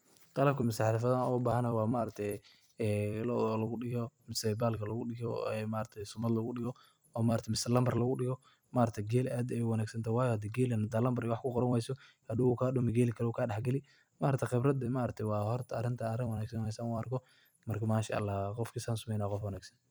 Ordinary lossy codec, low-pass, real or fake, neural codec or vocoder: none; none; fake; vocoder, 44.1 kHz, 128 mel bands every 256 samples, BigVGAN v2